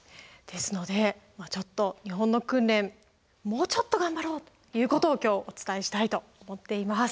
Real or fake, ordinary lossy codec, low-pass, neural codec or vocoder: real; none; none; none